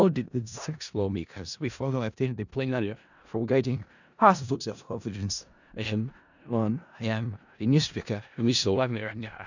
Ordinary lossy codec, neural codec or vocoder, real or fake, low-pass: none; codec, 16 kHz in and 24 kHz out, 0.4 kbps, LongCat-Audio-Codec, four codebook decoder; fake; 7.2 kHz